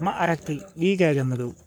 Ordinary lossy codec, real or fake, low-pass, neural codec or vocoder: none; fake; none; codec, 44.1 kHz, 3.4 kbps, Pupu-Codec